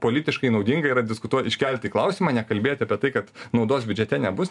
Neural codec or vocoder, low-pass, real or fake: none; 10.8 kHz; real